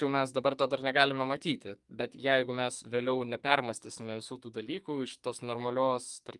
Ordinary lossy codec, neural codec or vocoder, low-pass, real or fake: Opus, 32 kbps; codec, 44.1 kHz, 2.6 kbps, SNAC; 10.8 kHz; fake